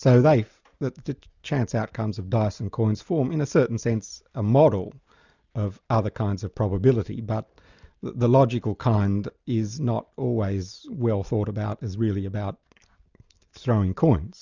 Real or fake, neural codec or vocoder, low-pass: real; none; 7.2 kHz